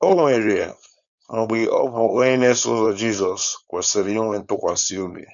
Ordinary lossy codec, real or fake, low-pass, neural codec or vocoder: none; fake; 7.2 kHz; codec, 16 kHz, 4.8 kbps, FACodec